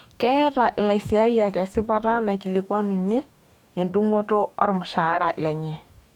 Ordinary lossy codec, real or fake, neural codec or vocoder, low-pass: none; fake; codec, 44.1 kHz, 2.6 kbps, DAC; 19.8 kHz